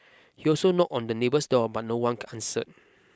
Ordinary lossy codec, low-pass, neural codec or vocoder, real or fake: none; none; none; real